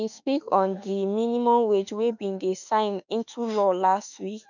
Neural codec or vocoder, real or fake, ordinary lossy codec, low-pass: autoencoder, 48 kHz, 32 numbers a frame, DAC-VAE, trained on Japanese speech; fake; none; 7.2 kHz